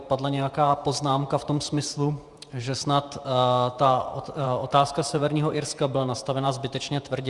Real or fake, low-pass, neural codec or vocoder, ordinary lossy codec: fake; 10.8 kHz; vocoder, 48 kHz, 128 mel bands, Vocos; Opus, 64 kbps